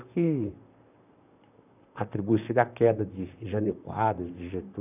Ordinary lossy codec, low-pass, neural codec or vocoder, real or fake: none; 3.6 kHz; vocoder, 44.1 kHz, 128 mel bands, Pupu-Vocoder; fake